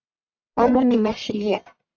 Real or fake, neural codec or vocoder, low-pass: fake; codec, 44.1 kHz, 1.7 kbps, Pupu-Codec; 7.2 kHz